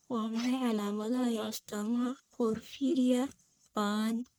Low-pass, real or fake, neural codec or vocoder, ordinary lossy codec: none; fake; codec, 44.1 kHz, 1.7 kbps, Pupu-Codec; none